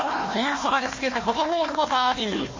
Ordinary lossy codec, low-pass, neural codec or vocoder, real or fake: MP3, 32 kbps; 7.2 kHz; codec, 16 kHz, 1 kbps, FunCodec, trained on Chinese and English, 50 frames a second; fake